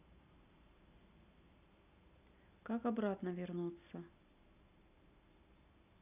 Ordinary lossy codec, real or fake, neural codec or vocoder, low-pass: none; real; none; 3.6 kHz